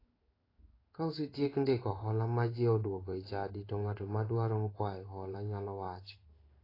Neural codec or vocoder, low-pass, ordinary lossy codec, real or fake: codec, 16 kHz in and 24 kHz out, 1 kbps, XY-Tokenizer; 5.4 kHz; AAC, 24 kbps; fake